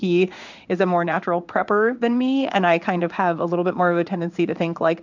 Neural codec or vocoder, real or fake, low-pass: codec, 16 kHz in and 24 kHz out, 1 kbps, XY-Tokenizer; fake; 7.2 kHz